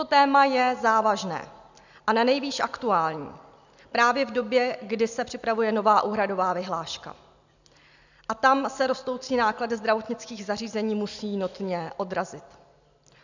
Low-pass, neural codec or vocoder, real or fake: 7.2 kHz; none; real